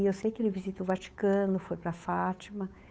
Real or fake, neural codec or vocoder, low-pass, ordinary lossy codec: fake; codec, 16 kHz, 8 kbps, FunCodec, trained on Chinese and English, 25 frames a second; none; none